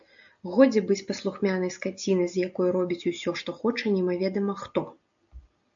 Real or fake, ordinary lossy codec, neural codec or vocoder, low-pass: real; AAC, 64 kbps; none; 7.2 kHz